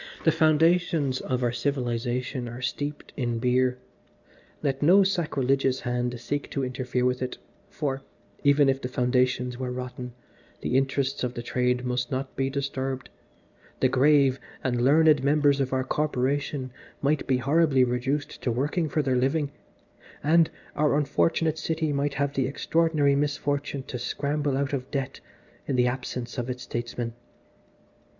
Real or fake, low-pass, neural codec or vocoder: real; 7.2 kHz; none